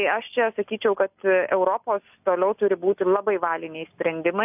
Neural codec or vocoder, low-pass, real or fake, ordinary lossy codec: none; 3.6 kHz; real; AAC, 32 kbps